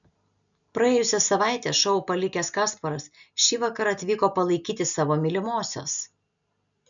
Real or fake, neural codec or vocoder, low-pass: real; none; 7.2 kHz